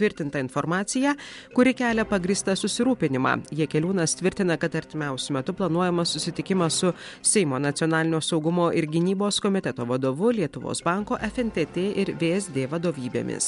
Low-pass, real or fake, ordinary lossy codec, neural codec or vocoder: 10.8 kHz; real; MP3, 64 kbps; none